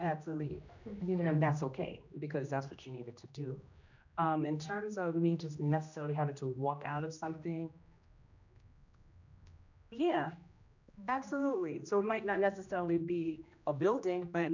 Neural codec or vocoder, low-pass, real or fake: codec, 16 kHz, 1 kbps, X-Codec, HuBERT features, trained on general audio; 7.2 kHz; fake